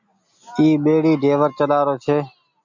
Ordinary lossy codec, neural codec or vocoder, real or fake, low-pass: MP3, 64 kbps; none; real; 7.2 kHz